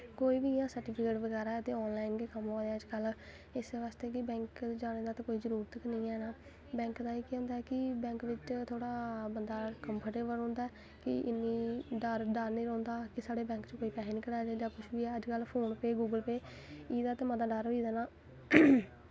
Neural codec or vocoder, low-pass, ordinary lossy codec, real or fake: none; none; none; real